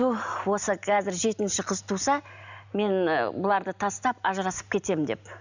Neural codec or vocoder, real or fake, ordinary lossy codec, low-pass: none; real; none; 7.2 kHz